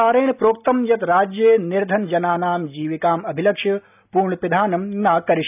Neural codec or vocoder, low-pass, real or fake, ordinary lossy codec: none; 3.6 kHz; real; none